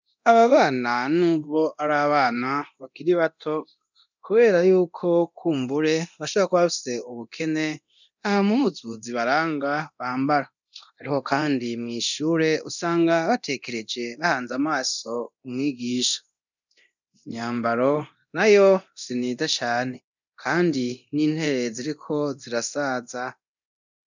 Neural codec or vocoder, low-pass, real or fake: codec, 24 kHz, 0.9 kbps, DualCodec; 7.2 kHz; fake